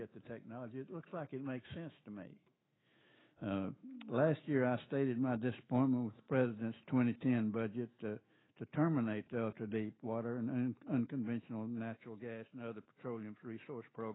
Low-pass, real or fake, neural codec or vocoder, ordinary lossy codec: 7.2 kHz; fake; autoencoder, 48 kHz, 128 numbers a frame, DAC-VAE, trained on Japanese speech; AAC, 16 kbps